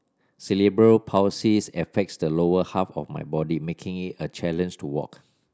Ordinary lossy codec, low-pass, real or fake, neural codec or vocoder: none; none; real; none